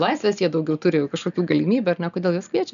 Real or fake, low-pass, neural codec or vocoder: real; 7.2 kHz; none